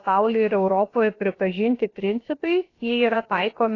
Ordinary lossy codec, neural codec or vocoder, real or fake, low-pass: AAC, 32 kbps; codec, 16 kHz, about 1 kbps, DyCAST, with the encoder's durations; fake; 7.2 kHz